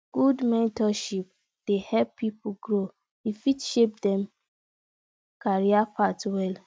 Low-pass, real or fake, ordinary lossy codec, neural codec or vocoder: none; real; none; none